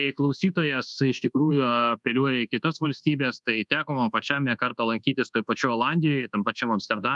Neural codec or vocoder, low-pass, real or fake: codec, 24 kHz, 1.2 kbps, DualCodec; 10.8 kHz; fake